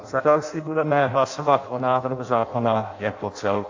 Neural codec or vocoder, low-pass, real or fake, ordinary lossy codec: codec, 16 kHz in and 24 kHz out, 0.6 kbps, FireRedTTS-2 codec; 7.2 kHz; fake; AAC, 48 kbps